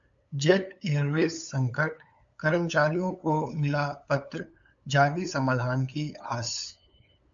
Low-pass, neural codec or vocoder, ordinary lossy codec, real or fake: 7.2 kHz; codec, 16 kHz, 8 kbps, FunCodec, trained on LibriTTS, 25 frames a second; MP3, 64 kbps; fake